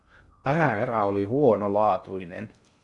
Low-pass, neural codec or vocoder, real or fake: 10.8 kHz; codec, 16 kHz in and 24 kHz out, 0.6 kbps, FocalCodec, streaming, 2048 codes; fake